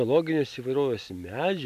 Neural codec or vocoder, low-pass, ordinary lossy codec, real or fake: none; 14.4 kHz; AAC, 96 kbps; real